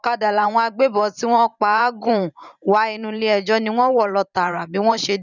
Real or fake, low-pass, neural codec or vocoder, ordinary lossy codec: fake; 7.2 kHz; vocoder, 44.1 kHz, 128 mel bands every 512 samples, BigVGAN v2; none